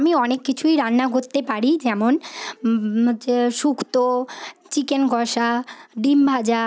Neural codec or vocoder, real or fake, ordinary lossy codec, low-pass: none; real; none; none